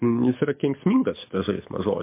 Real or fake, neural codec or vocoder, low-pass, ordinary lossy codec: fake; codec, 24 kHz, 6 kbps, HILCodec; 3.6 kHz; MP3, 24 kbps